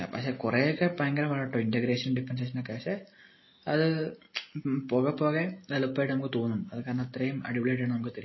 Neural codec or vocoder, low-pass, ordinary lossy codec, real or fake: none; 7.2 kHz; MP3, 24 kbps; real